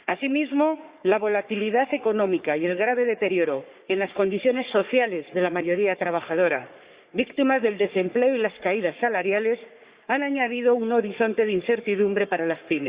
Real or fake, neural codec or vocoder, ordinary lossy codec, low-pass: fake; codec, 44.1 kHz, 3.4 kbps, Pupu-Codec; Opus, 64 kbps; 3.6 kHz